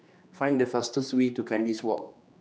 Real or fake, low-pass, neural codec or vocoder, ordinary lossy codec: fake; none; codec, 16 kHz, 2 kbps, X-Codec, HuBERT features, trained on general audio; none